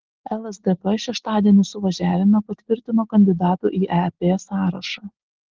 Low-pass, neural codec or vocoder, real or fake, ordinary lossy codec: 7.2 kHz; none; real; Opus, 16 kbps